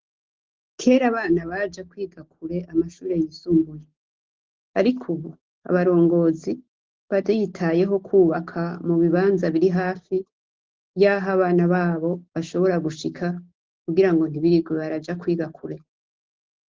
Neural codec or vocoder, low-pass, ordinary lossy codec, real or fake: none; 7.2 kHz; Opus, 16 kbps; real